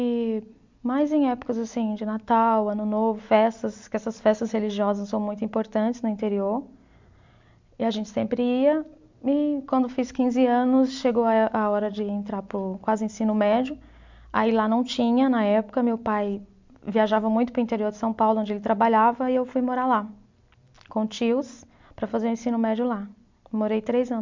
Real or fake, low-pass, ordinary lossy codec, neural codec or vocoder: real; 7.2 kHz; MP3, 64 kbps; none